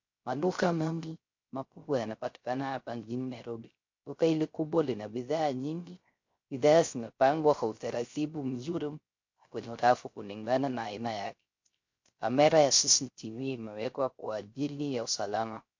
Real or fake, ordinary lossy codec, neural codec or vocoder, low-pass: fake; MP3, 48 kbps; codec, 16 kHz, 0.3 kbps, FocalCodec; 7.2 kHz